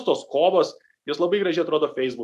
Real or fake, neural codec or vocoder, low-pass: real; none; 14.4 kHz